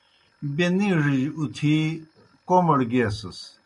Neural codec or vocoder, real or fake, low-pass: none; real; 10.8 kHz